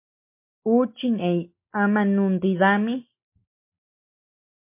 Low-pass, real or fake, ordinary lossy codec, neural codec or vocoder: 3.6 kHz; real; MP3, 24 kbps; none